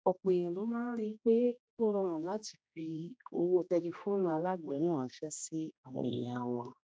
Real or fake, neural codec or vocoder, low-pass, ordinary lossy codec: fake; codec, 16 kHz, 1 kbps, X-Codec, HuBERT features, trained on general audio; none; none